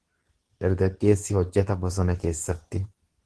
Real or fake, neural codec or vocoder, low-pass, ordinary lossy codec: fake; codec, 24 kHz, 0.9 kbps, WavTokenizer, medium speech release version 2; 10.8 kHz; Opus, 16 kbps